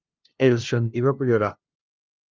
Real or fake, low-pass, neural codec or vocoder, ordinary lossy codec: fake; 7.2 kHz; codec, 16 kHz, 0.5 kbps, FunCodec, trained on LibriTTS, 25 frames a second; Opus, 24 kbps